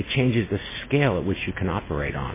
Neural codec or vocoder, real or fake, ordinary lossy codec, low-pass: none; real; MP3, 16 kbps; 3.6 kHz